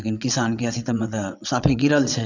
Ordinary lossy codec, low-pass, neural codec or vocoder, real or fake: none; 7.2 kHz; vocoder, 22.05 kHz, 80 mel bands, WaveNeXt; fake